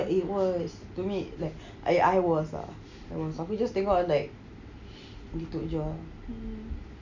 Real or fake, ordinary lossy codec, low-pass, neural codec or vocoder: real; none; 7.2 kHz; none